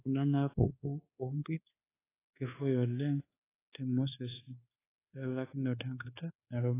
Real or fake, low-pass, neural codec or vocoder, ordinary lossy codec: fake; 3.6 kHz; codec, 24 kHz, 1.2 kbps, DualCodec; AAC, 16 kbps